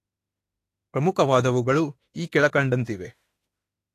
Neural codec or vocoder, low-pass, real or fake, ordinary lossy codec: autoencoder, 48 kHz, 32 numbers a frame, DAC-VAE, trained on Japanese speech; 14.4 kHz; fake; AAC, 48 kbps